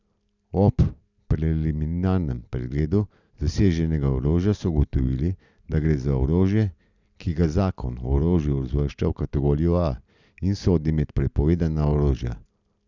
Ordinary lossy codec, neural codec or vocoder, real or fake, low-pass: none; none; real; 7.2 kHz